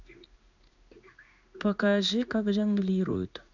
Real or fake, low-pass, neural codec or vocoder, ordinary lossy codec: fake; 7.2 kHz; codec, 16 kHz in and 24 kHz out, 1 kbps, XY-Tokenizer; none